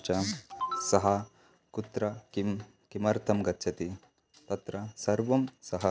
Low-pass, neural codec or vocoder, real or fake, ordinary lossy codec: none; none; real; none